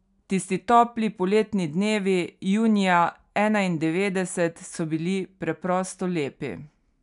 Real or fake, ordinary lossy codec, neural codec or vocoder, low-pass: real; none; none; 10.8 kHz